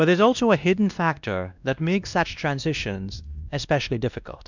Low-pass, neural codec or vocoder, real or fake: 7.2 kHz; codec, 16 kHz, 1 kbps, X-Codec, WavLM features, trained on Multilingual LibriSpeech; fake